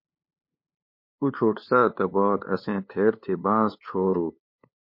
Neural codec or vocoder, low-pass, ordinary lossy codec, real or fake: codec, 16 kHz, 2 kbps, FunCodec, trained on LibriTTS, 25 frames a second; 5.4 kHz; MP3, 32 kbps; fake